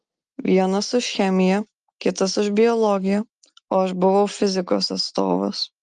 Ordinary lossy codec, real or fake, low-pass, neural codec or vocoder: Opus, 32 kbps; real; 9.9 kHz; none